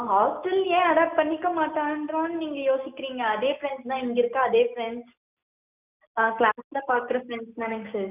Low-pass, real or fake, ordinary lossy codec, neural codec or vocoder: 3.6 kHz; real; none; none